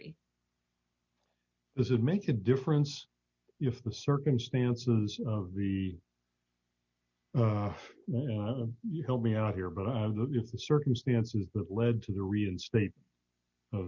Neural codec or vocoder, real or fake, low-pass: none; real; 7.2 kHz